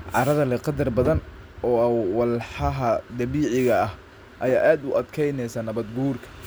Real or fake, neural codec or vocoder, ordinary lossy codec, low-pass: real; none; none; none